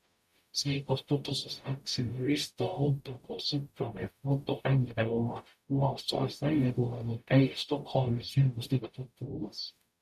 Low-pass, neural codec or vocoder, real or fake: 14.4 kHz; codec, 44.1 kHz, 0.9 kbps, DAC; fake